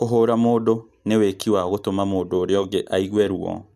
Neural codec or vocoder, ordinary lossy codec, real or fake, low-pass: none; none; real; 14.4 kHz